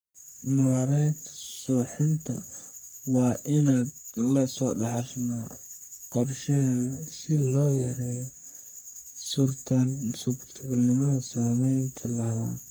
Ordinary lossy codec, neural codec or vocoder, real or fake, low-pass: none; codec, 44.1 kHz, 3.4 kbps, Pupu-Codec; fake; none